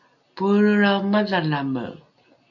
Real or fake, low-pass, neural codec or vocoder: real; 7.2 kHz; none